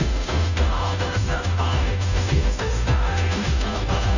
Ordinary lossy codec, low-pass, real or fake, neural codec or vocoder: none; 7.2 kHz; fake; codec, 16 kHz, 0.5 kbps, FunCodec, trained on Chinese and English, 25 frames a second